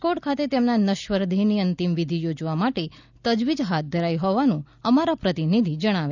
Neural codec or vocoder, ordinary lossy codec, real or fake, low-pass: none; none; real; 7.2 kHz